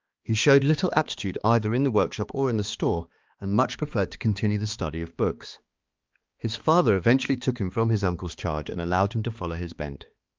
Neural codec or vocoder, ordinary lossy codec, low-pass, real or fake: codec, 16 kHz, 2 kbps, X-Codec, HuBERT features, trained on balanced general audio; Opus, 32 kbps; 7.2 kHz; fake